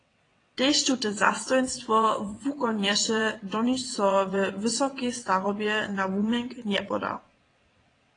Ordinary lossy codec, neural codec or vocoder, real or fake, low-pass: AAC, 32 kbps; vocoder, 22.05 kHz, 80 mel bands, WaveNeXt; fake; 9.9 kHz